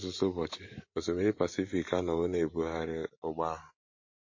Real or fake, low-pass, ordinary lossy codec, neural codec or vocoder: fake; 7.2 kHz; MP3, 32 kbps; vocoder, 44.1 kHz, 128 mel bands every 512 samples, BigVGAN v2